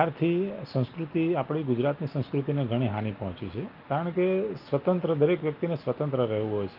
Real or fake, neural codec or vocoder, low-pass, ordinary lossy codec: real; none; 5.4 kHz; Opus, 24 kbps